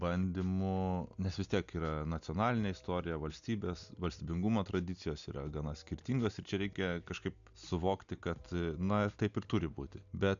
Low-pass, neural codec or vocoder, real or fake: 7.2 kHz; none; real